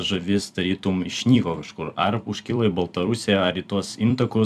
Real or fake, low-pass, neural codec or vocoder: fake; 14.4 kHz; vocoder, 44.1 kHz, 128 mel bands every 256 samples, BigVGAN v2